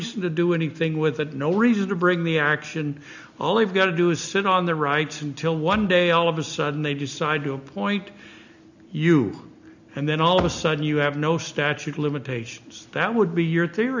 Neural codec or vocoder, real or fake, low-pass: none; real; 7.2 kHz